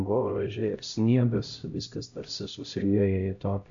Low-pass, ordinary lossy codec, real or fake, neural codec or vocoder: 7.2 kHz; AAC, 64 kbps; fake; codec, 16 kHz, 0.5 kbps, X-Codec, HuBERT features, trained on LibriSpeech